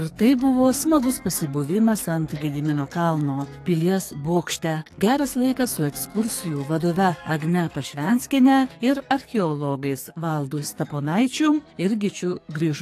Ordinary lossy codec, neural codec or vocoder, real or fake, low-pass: AAC, 64 kbps; codec, 44.1 kHz, 2.6 kbps, SNAC; fake; 14.4 kHz